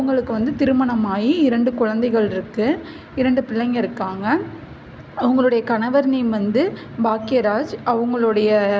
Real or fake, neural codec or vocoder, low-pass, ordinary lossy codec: real; none; none; none